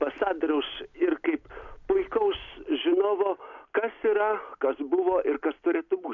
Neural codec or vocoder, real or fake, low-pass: none; real; 7.2 kHz